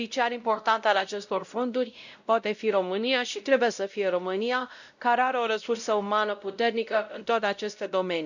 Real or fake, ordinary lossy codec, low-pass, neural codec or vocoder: fake; none; 7.2 kHz; codec, 16 kHz, 0.5 kbps, X-Codec, WavLM features, trained on Multilingual LibriSpeech